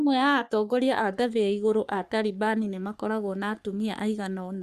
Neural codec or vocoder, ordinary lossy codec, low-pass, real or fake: codec, 44.1 kHz, 3.4 kbps, Pupu-Codec; Opus, 64 kbps; 14.4 kHz; fake